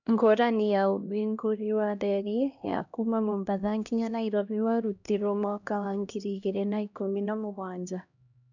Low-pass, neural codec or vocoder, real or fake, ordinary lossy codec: 7.2 kHz; codec, 16 kHz, 1 kbps, X-Codec, HuBERT features, trained on LibriSpeech; fake; none